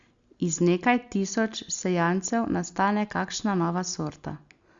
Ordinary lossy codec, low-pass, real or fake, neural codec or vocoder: Opus, 64 kbps; 7.2 kHz; real; none